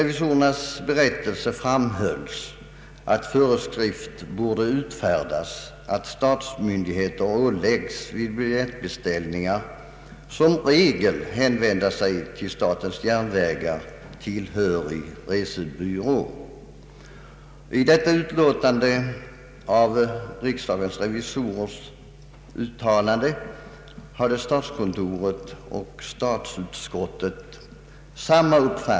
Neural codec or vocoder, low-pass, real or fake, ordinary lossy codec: none; none; real; none